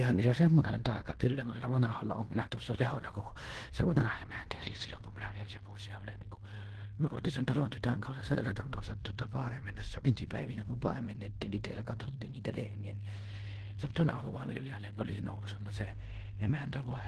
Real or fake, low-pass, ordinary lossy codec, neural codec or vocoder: fake; 10.8 kHz; Opus, 16 kbps; codec, 16 kHz in and 24 kHz out, 0.9 kbps, LongCat-Audio-Codec, fine tuned four codebook decoder